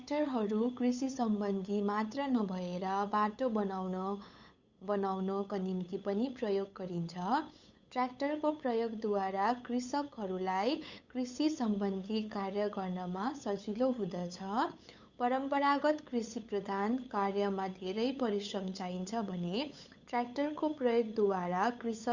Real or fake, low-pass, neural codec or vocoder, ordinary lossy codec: fake; 7.2 kHz; codec, 16 kHz, 8 kbps, FunCodec, trained on LibriTTS, 25 frames a second; Opus, 64 kbps